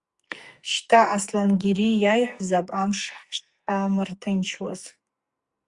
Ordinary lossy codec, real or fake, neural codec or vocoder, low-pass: Opus, 64 kbps; fake; codec, 44.1 kHz, 2.6 kbps, SNAC; 10.8 kHz